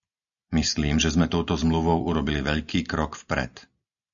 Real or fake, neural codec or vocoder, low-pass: real; none; 7.2 kHz